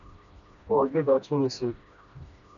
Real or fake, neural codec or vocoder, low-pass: fake; codec, 16 kHz, 1 kbps, FreqCodec, smaller model; 7.2 kHz